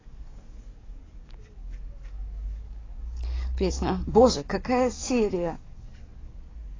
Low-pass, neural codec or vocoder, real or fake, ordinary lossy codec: 7.2 kHz; codec, 44.1 kHz, 7.8 kbps, DAC; fake; AAC, 32 kbps